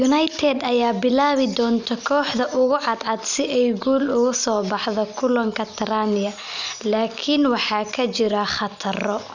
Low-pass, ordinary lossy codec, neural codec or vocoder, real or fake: 7.2 kHz; none; none; real